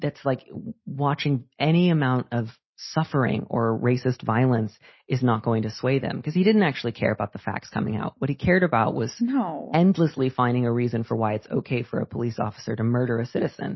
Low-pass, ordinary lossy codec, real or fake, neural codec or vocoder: 7.2 kHz; MP3, 24 kbps; real; none